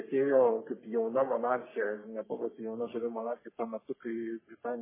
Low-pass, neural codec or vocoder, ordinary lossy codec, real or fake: 3.6 kHz; codec, 44.1 kHz, 3.4 kbps, Pupu-Codec; MP3, 16 kbps; fake